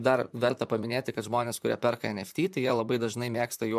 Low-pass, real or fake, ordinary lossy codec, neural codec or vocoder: 14.4 kHz; fake; MP3, 96 kbps; vocoder, 44.1 kHz, 128 mel bands, Pupu-Vocoder